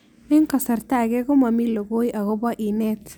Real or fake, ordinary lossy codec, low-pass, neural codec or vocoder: real; none; none; none